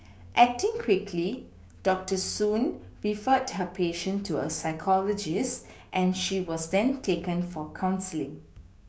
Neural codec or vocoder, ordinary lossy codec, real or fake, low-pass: codec, 16 kHz, 6 kbps, DAC; none; fake; none